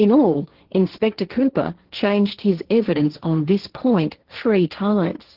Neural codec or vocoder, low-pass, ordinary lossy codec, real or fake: codec, 16 kHz, 1.1 kbps, Voila-Tokenizer; 5.4 kHz; Opus, 16 kbps; fake